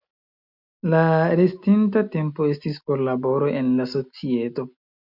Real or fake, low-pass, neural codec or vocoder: real; 5.4 kHz; none